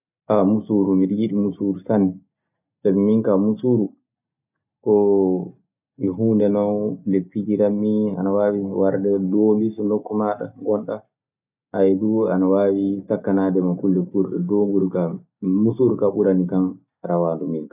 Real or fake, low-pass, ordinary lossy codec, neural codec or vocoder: real; 3.6 kHz; none; none